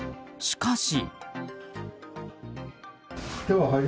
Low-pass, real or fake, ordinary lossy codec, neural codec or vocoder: none; real; none; none